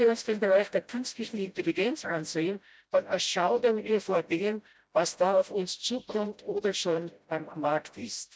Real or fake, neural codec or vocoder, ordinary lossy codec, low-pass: fake; codec, 16 kHz, 0.5 kbps, FreqCodec, smaller model; none; none